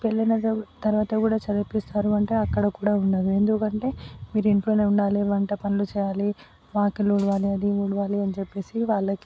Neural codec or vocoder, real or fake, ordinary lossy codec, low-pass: none; real; none; none